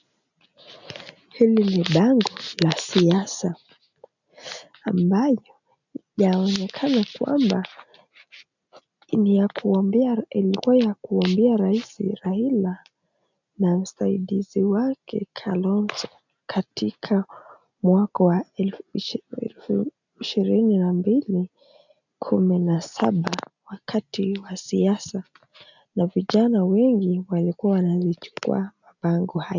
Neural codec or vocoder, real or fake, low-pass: none; real; 7.2 kHz